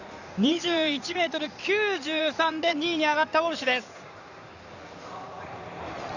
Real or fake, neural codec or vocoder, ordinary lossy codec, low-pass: fake; codec, 16 kHz in and 24 kHz out, 2.2 kbps, FireRedTTS-2 codec; none; 7.2 kHz